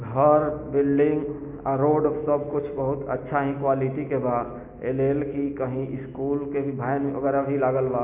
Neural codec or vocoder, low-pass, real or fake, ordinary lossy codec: none; 3.6 kHz; real; none